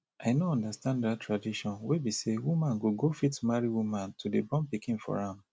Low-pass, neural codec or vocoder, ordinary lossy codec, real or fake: none; none; none; real